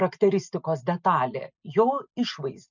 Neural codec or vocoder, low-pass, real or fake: none; 7.2 kHz; real